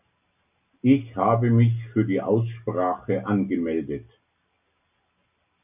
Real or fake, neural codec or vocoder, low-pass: real; none; 3.6 kHz